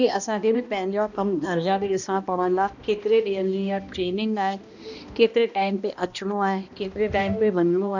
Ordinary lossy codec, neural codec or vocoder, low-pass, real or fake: none; codec, 16 kHz, 1 kbps, X-Codec, HuBERT features, trained on balanced general audio; 7.2 kHz; fake